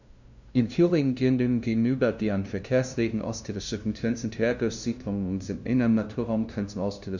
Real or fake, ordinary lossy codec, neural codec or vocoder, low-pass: fake; none; codec, 16 kHz, 0.5 kbps, FunCodec, trained on LibriTTS, 25 frames a second; 7.2 kHz